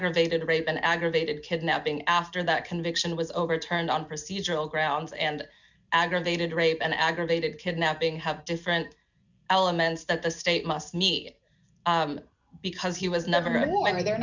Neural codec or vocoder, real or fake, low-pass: none; real; 7.2 kHz